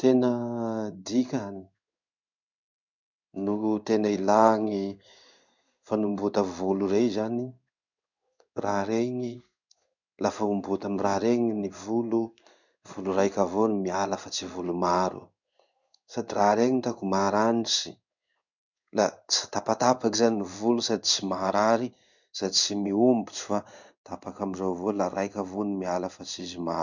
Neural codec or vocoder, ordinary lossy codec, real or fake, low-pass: codec, 16 kHz in and 24 kHz out, 1 kbps, XY-Tokenizer; none; fake; 7.2 kHz